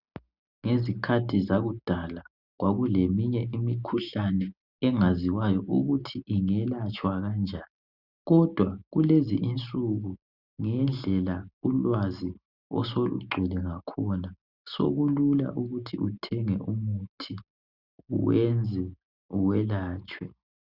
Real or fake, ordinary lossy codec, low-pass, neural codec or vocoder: real; Opus, 64 kbps; 5.4 kHz; none